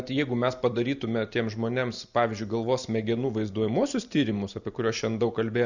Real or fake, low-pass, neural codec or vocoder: real; 7.2 kHz; none